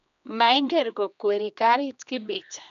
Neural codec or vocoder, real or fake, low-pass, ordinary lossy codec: codec, 16 kHz, 2 kbps, X-Codec, HuBERT features, trained on general audio; fake; 7.2 kHz; none